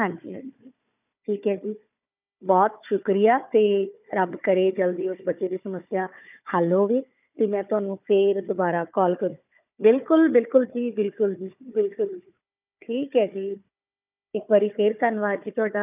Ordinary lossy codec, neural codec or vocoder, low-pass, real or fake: none; codec, 16 kHz, 4 kbps, FunCodec, trained on Chinese and English, 50 frames a second; 3.6 kHz; fake